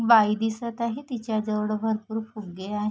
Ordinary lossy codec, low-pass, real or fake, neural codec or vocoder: none; none; real; none